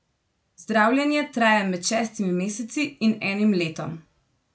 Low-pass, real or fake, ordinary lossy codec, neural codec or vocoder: none; real; none; none